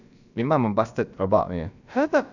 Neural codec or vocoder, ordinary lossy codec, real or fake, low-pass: codec, 16 kHz, about 1 kbps, DyCAST, with the encoder's durations; none; fake; 7.2 kHz